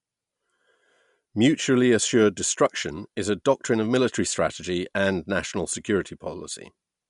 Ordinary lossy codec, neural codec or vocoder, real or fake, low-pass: MP3, 64 kbps; none; real; 10.8 kHz